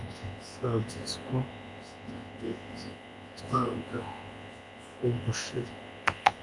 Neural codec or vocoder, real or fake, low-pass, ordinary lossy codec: codec, 24 kHz, 0.9 kbps, WavTokenizer, large speech release; fake; 10.8 kHz; MP3, 64 kbps